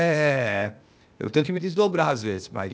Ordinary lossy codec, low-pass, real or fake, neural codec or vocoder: none; none; fake; codec, 16 kHz, 0.8 kbps, ZipCodec